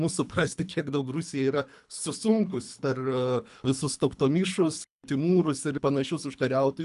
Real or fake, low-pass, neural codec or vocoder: fake; 10.8 kHz; codec, 24 kHz, 3 kbps, HILCodec